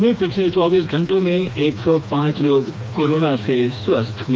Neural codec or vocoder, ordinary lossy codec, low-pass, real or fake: codec, 16 kHz, 2 kbps, FreqCodec, smaller model; none; none; fake